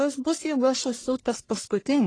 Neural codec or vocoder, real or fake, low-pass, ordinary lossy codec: codec, 44.1 kHz, 1.7 kbps, Pupu-Codec; fake; 9.9 kHz; AAC, 32 kbps